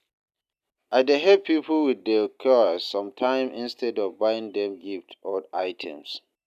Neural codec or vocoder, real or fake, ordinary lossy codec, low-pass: none; real; none; 14.4 kHz